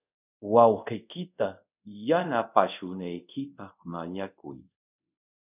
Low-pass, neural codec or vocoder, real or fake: 3.6 kHz; codec, 24 kHz, 0.5 kbps, DualCodec; fake